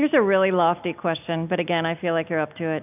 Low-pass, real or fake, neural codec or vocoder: 3.6 kHz; real; none